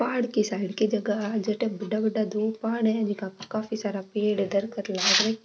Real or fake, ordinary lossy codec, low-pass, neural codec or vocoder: real; none; none; none